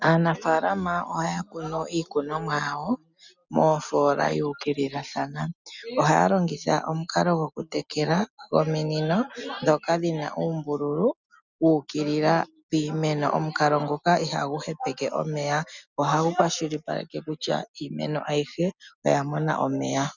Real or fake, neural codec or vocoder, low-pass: real; none; 7.2 kHz